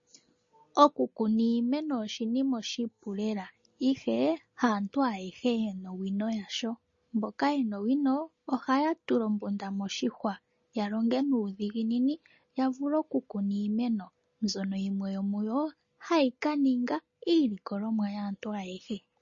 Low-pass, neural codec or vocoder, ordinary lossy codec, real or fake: 7.2 kHz; none; MP3, 32 kbps; real